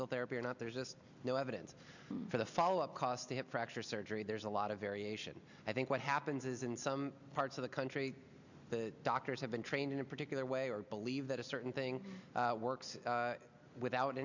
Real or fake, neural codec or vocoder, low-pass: real; none; 7.2 kHz